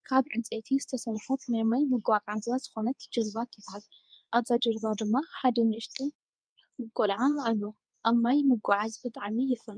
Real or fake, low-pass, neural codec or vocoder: fake; 9.9 kHz; codec, 24 kHz, 0.9 kbps, WavTokenizer, medium speech release version 2